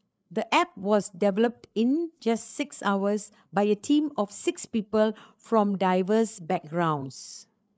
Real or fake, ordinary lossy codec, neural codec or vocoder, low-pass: fake; none; codec, 16 kHz, 8 kbps, FreqCodec, larger model; none